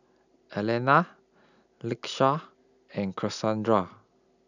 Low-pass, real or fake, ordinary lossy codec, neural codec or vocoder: 7.2 kHz; real; none; none